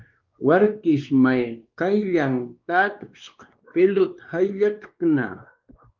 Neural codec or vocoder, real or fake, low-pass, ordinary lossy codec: codec, 16 kHz, 2 kbps, X-Codec, WavLM features, trained on Multilingual LibriSpeech; fake; 7.2 kHz; Opus, 24 kbps